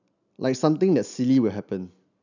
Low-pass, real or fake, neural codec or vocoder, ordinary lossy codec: 7.2 kHz; real; none; none